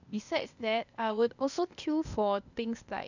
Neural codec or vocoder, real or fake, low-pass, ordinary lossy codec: codec, 16 kHz, 0.8 kbps, ZipCodec; fake; 7.2 kHz; none